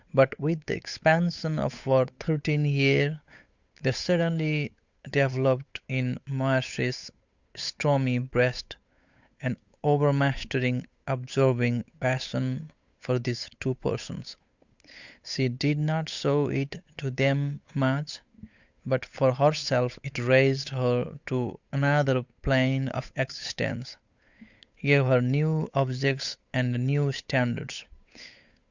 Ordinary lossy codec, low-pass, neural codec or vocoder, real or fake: Opus, 64 kbps; 7.2 kHz; codec, 16 kHz, 8 kbps, FunCodec, trained on Chinese and English, 25 frames a second; fake